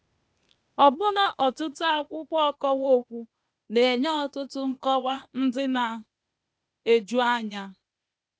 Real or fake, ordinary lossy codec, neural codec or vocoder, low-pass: fake; none; codec, 16 kHz, 0.8 kbps, ZipCodec; none